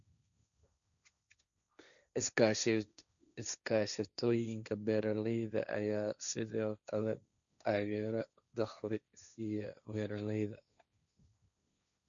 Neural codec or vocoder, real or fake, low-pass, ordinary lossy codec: codec, 16 kHz, 1.1 kbps, Voila-Tokenizer; fake; 7.2 kHz; none